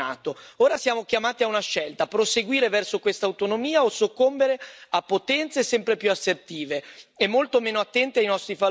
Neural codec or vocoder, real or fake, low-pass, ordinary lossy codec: none; real; none; none